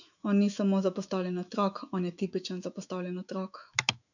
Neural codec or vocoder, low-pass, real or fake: autoencoder, 48 kHz, 128 numbers a frame, DAC-VAE, trained on Japanese speech; 7.2 kHz; fake